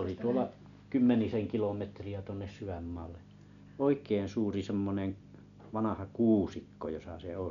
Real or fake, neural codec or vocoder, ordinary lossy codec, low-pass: real; none; none; 7.2 kHz